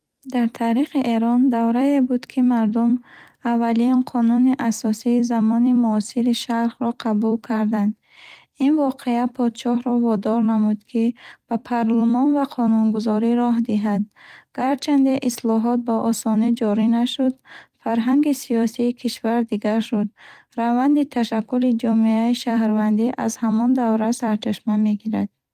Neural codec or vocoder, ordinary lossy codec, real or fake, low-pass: vocoder, 44.1 kHz, 128 mel bands every 256 samples, BigVGAN v2; Opus, 32 kbps; fake; 14.4 kHz